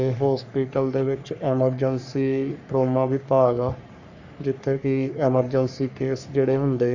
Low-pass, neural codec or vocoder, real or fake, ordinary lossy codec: 7.2 kHz; codec, 44.1 kHz, 3.4 kbps, Pupu-Codec; fake; none